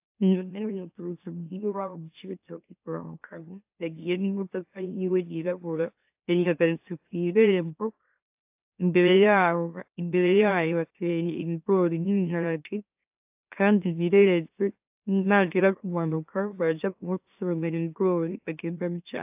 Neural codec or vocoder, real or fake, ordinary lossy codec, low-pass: autoencoder, 44.1 kHz, a latent of 192 numbers a frame, MeloTTS; fake; AAC, 32 kbps; 3.6 kHz